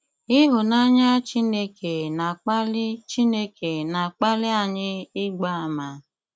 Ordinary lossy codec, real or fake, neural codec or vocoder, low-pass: none; real; none; none